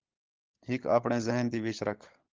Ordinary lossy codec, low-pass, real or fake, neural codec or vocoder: Opus, 16 kbps; 7.2 kHz; fake; codec, 16 kHz, 16 kbps, FunCodec, trained on LibriTTS, 50 frames a second